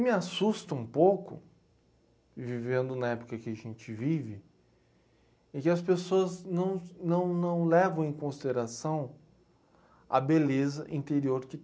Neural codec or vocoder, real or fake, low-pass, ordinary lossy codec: none; real; none; none